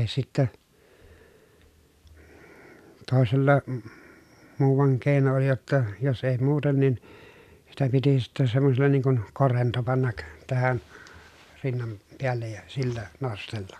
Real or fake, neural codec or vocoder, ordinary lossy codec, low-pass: real; none; none; 14.4 kHz